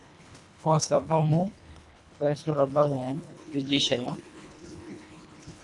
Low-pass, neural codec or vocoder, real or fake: 10.8 kHz; codec, 24 kHz, 1.5 kbps, HILCodec; fake